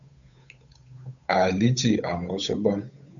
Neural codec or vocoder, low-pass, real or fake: codec, 16 kHz, 8 kbps, FunCodec, trained on Chinese and English, 25 frames a second; 7.2 kHz; fake